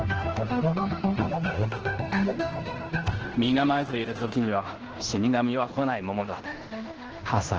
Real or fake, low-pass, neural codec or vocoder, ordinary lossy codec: fake; 7.2 kHz; codec, 16 kHz in and 24 kHz out, 0.9 kbps, LongCat-Audio-Codec, fine tuned four codebook decoder; Opus, 16 kbps